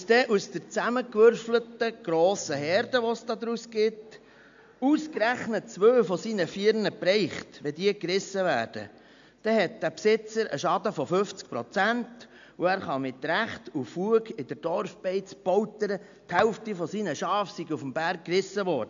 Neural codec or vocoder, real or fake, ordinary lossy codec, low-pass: none; real; AAC, 64 kbps; 7.2 kHz